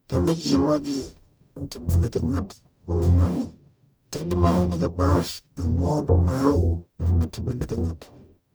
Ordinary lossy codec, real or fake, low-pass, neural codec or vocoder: none; fake; none; codec, 44.1 kHz, 0.9 kbps, DAC